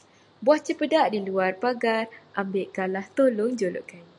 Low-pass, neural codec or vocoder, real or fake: 10.8 kHz; none; real